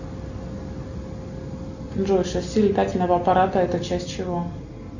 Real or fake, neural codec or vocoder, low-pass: real; none; 7.2 kHz